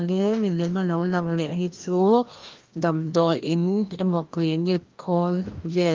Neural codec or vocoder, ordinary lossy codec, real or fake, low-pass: codec, 16 kHz, 1 kbps, FreqCodec, larger model; Opus, 32 kbps; fake; 7.2 kHz